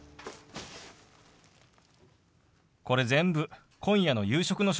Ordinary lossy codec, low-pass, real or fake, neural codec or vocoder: none; none; real; none